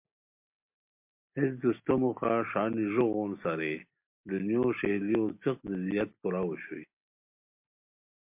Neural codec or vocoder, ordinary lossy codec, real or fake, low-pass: none; MP3, 32 kbps; real; 3.6 kHz